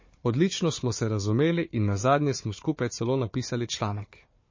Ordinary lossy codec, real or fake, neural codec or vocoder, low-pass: MP3, 32 kbps; fake; codec, 16 kHz, 4 kbps, FunCodec, trained on Chinese and English, 50 frames a second; 7.2 kHz